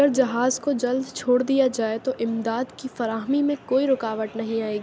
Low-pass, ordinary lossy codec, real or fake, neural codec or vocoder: none; none; real; none